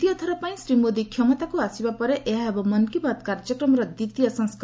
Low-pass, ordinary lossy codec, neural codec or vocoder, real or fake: none; none; none; real